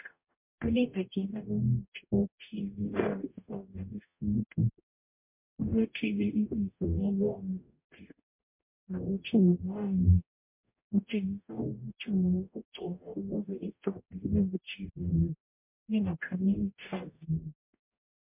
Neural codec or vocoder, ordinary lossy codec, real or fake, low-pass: codec, 44.1 kHz, 0.9 kbps, DAC; MP3, 32 kbps; fake; 3.6 kHz